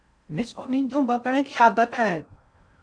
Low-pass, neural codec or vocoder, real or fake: 9.9 kHz; codec, 16 kHz in and 24 kHz out, 0.6 kbps, FocalCodec, streaming, 4096 codes; fake